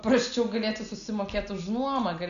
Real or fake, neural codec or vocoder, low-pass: real; none; 7.2 kHz